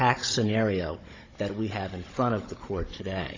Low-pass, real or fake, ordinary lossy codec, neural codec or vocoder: 7.2 kHz; fake; AAC, 32 kbps; codec, 16 kHz, 16 kbps, FunCodec, trained on Chinese and English, 50 frames a second